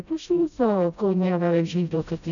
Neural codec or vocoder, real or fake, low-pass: codec, 16 kHz, 0.5 kbps, FreqCodec, smaller model; fake; 7.2 kHz